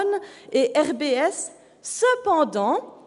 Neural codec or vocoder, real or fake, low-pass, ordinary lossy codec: none; real; 10.8 kHz; none